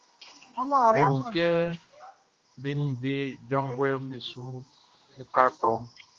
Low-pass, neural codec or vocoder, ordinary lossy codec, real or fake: 7.2 kHz; codec, 16 kHz, 1 kbps, X-Codec, HuBERT features, trained on general audio; Opus, 32 kbps; fake